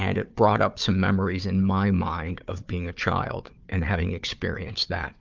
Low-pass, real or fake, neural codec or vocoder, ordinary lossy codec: 7.2 kHz; fake; codec, 16 kHz, 4 kbps, FunCodec, trained on Chinese and English, 50 frames a second; Opus, 24 kbps